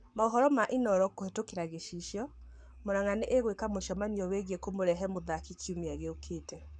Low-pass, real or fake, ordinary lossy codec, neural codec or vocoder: 9.9 kHz; fake; none; codec, 44.1 kHz, 7.8 kbps, Pupu-Codec